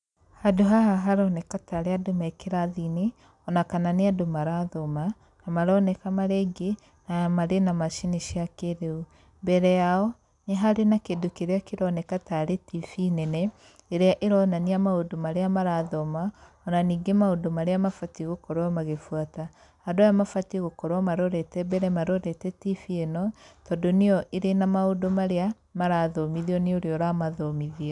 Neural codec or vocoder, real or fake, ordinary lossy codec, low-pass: none; real; none; 10.8 kHz